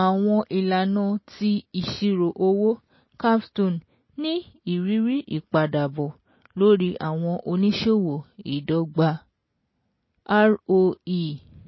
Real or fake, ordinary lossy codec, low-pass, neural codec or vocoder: real; MP3, 24 kbps; 7.2 kHz; none